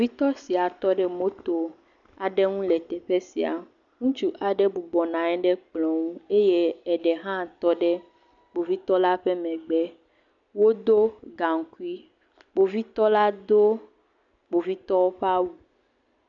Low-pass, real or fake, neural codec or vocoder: 7.2 kHz; real; none